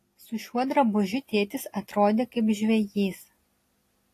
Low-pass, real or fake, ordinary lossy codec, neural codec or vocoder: 14.4 kHz; real; AAC, 48 kbps; none